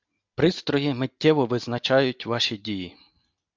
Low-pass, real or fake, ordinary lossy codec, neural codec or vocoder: 7.2 kHz; real; MP3, 64 kbps; none